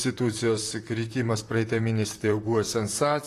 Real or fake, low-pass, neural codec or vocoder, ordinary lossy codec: fake; 14.4 kHz; vocoder, 44.1 kHz, 128 mel bands, Pupu-Vocoder; AAC, 48 kbps